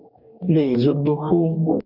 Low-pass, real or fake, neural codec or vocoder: 5.4 kHz; fake; codec, 44.1 kHz, 2.6 kbps, DAC